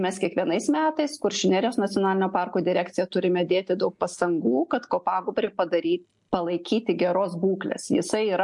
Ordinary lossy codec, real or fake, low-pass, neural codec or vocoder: MP3, 64 kbps; real; 10.8 kHz; none